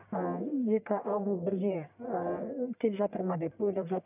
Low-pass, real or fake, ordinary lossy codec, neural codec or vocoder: 3.6 kHz; fake; none; codec, 44.1 kHz, 1.7 kbps, Pupu-Codec